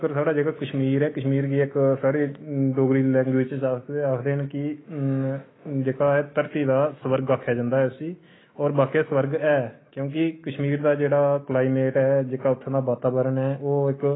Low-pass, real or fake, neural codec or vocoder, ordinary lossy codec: 7.2 kHz; fake; autoencoder, 48 kHz, 128 numbers a frame, DAC-VAE, trained on Japanese speech; AAC, 16 kbps